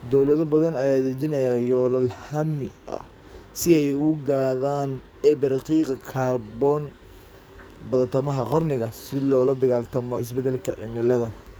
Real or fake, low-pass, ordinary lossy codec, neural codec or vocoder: fake; none; none; codec, 44.1 kHz, 2.6 kbps, SNAC